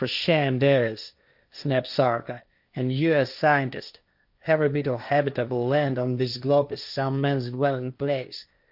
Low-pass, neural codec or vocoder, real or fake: 5.4 kHz; codec, 16 kHz, 1.1 kbps, Voila-Tokenizer; fake